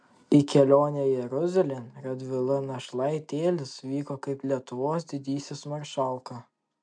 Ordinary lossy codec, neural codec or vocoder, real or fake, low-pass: MP3, 64 kbps; none; real; 9.9 kHz